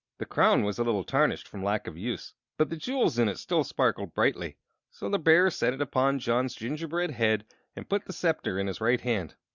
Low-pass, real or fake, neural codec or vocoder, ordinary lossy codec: 7.2 kHz; real; none; Opus, 64 kbps